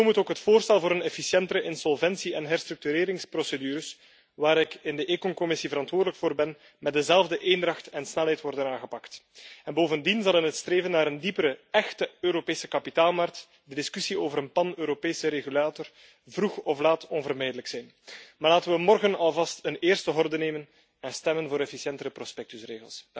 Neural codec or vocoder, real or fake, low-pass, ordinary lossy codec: none; real; none; none